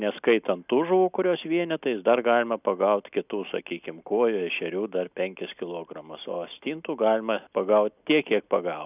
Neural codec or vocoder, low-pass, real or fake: none; 3.6 kHz; real